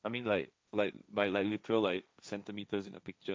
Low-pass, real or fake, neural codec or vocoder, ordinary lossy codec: none; fake; codec, 16 kHz, 1.1 kbps, Voila-Tokenizer; none